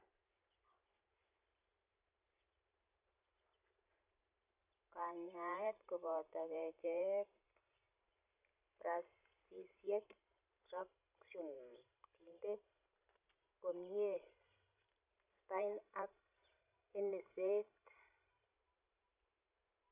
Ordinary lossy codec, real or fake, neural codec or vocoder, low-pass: none; fake; vocoder, 44.1 kHz, 128 mel bands every 512 samples, BigVGAN v2; 3.6 kHz